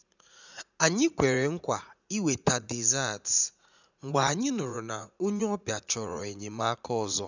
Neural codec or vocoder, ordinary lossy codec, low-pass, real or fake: vocoder, 44.1 kHz, 80 mel bands, Vocos; none; 7.2 kHz; fake